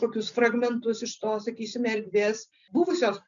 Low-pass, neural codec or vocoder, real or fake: 7.2 kHz; none; real